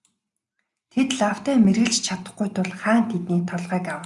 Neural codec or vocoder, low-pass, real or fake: none; 10.8 kHz; real